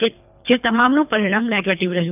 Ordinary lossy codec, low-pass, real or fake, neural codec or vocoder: none; 3.6 kHz; fake; codec, 24 kHz, 3 kbps, HILCodec